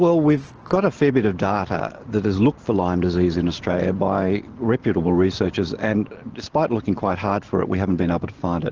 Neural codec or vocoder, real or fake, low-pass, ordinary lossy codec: none; real; 7.2 kHz; Opus, 16 kbps